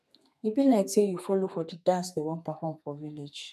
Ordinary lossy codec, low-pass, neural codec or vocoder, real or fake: none; 14.4 kHz; codec, 32 kHz, 1.9 kbps, SNAC; fake